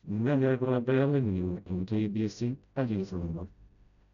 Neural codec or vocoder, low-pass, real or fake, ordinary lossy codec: codec, 16 kHz, 0.5 kbps, FreqCodec, smaller model; 7.2 kHz; fake; none